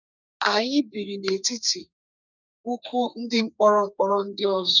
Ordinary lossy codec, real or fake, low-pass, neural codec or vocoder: none; fake; 7.2 kHz; codec, 32 kHz, 1.9 kbps, SNAC